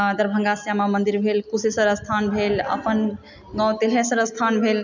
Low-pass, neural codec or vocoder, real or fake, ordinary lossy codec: 7.2 kHz; none; real; none